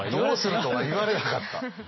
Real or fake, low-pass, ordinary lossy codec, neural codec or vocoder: real; 7.2 kHz; MP3, 24 kbps; none